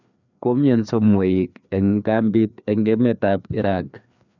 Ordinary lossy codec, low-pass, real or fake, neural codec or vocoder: none; 7.2 kHz; fake; codec, 16 kHz, 2 kbps, FreqCodec, larger model